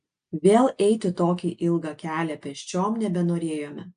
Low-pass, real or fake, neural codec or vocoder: 9.9 kHz; real; none